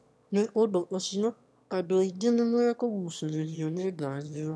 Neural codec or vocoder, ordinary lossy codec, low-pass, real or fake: autoencoder, 22.05 kHz, a latent of 192 numbers a frame, VITS, trained on one speaker; none; none; fake